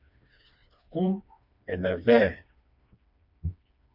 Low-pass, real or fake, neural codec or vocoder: 5.4 kHz; fake; codec, 16 kHz, 2 kbps, FreqCodec, smaller model